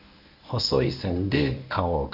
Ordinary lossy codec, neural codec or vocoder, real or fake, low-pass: none; codec, 24 kHz, 0.9 kbps, WavTokenizer, medium speech release version 1; fake; 5.4 kHz